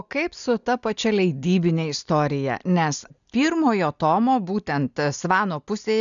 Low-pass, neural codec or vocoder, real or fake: 7.2 kHz; none; real